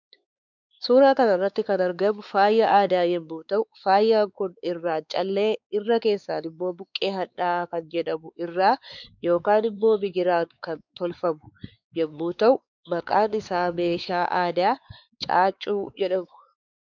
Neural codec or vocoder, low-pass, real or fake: codec, 16 kHz, 4 kbps, X-Codec, WavLM features, trained on Multilingual LibriSpeech; 7.2 kHz; fake